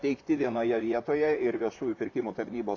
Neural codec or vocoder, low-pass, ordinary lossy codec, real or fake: vocoder, 44.1 kHz, 128 mel bands, Pupu-Vocoder; 7.2 kHz; AAC, 48 kbps; fake